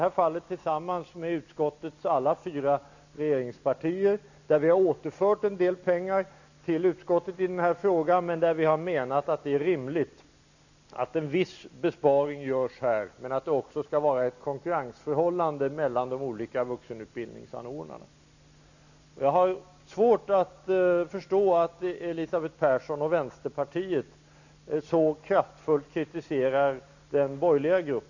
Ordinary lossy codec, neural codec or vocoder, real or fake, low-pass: none; none; real; 7.2 kHz